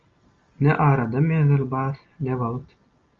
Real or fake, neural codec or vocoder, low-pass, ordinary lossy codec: real; none; 7.2 kHz; Opus, 32 kbps